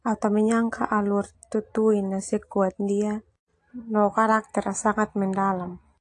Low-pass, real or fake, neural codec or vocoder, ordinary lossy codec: 10.8 kHz; real; none; AAC, 48 kbps